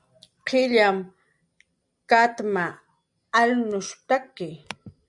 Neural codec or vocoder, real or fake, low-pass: none; real; 10.8 kHz